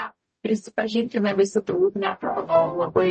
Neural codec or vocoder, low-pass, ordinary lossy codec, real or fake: codec, 44.1 kHz, 0.9 kbps, DAC; 10.8 kHz; MP3, 32 kbps; fake